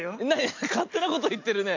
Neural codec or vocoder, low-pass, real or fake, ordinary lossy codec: none; 7.2 kHz; real; MP3, 48 kbps